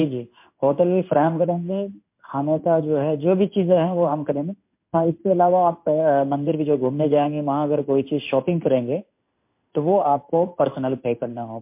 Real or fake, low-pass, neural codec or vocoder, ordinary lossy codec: fake; 3.6 kHz; codec, 16 kHz in and 24 kHz out, 1 kbps, XY-Tokenizer; MP3, 32 kbps